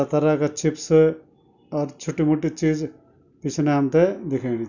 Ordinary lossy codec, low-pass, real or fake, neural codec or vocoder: none; 7.2 kHz; real; none